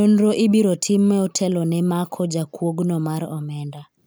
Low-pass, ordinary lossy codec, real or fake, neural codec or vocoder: none; none; real; none